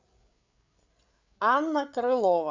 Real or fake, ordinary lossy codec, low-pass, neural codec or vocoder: fake; MP3, 64 kbps; 7.2 kHz; codec, 16 kHz, 8 kbps, FreqCodec, larger model